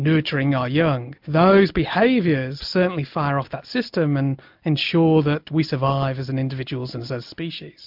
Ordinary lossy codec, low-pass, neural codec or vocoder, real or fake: MP3, 48 kbps; 5.4 kHz; none; real